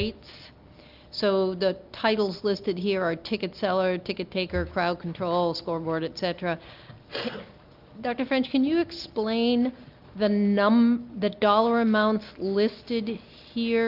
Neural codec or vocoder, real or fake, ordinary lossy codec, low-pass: none; real; Opus, 32 kbps; 5.4 kHz